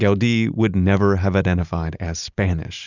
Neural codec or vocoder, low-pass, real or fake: none; 7.2 kHz; real